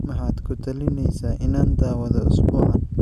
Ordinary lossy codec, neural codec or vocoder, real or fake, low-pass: none; none; real; 14.4 kHz